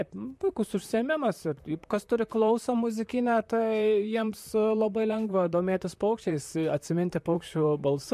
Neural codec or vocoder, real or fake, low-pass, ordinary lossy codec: vocoder, 44.1 kHz, 128 mel bands, Pupu-Vocoder; fake; 14.4 kHz; MP3, 64 kbps